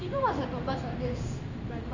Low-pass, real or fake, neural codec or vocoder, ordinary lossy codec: 7.2 kHz; fake; codec, 16 kHz in and 24 kHz out, 1 kbps, XY-Tokenizer; Opus, 64 kbps